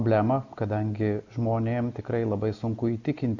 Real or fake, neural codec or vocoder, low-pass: real; none; 7.2 kHz